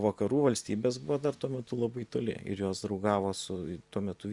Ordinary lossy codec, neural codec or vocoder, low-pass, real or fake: Opus, 64 kbps; none; 10.8 kHz; real